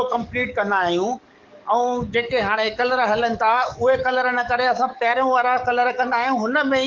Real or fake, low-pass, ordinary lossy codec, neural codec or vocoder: fake; 7.2 kHz; Opus, 32 kbps; codec, 44.1 kHz, 7.8 kbps, DAC